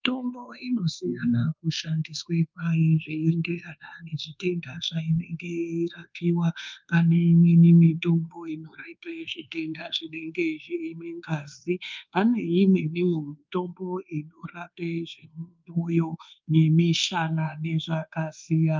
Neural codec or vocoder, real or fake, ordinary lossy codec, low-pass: codec, 24 kHz, 1.2 kbps, DualCodec; fake; Opus, 32 kbps; 7.2 kHz